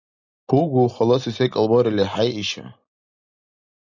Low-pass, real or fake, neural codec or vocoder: 7.2 kHz; real; none